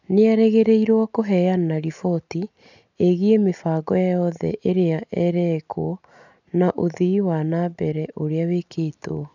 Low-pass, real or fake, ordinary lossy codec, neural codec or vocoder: 7.2 kHz; real; none; none